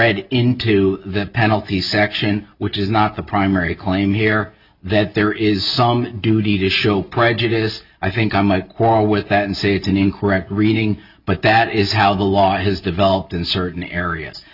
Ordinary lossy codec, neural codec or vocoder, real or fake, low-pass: Opus, 64 kbps; none; real; 5.4 kHz